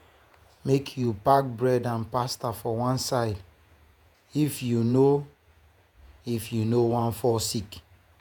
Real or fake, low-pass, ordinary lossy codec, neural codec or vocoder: fake; none; none; vocoder, 48 kHz, 128 mel bands, Vocos